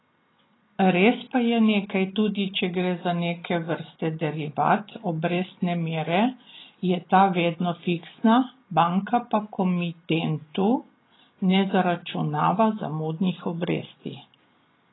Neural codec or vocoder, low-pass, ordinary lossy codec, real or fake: none; 7.2 kHz; AAC, 16 kbps; real